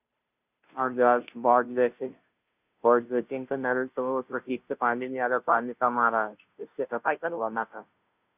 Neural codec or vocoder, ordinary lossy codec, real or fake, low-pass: codec, 16 kHz, 0.5 kbps, FunCodec, trained on Chinese and English, 25 frames a second; none; fake; 3.6 kHz